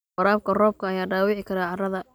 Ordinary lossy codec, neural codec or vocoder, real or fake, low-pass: none; none; real; none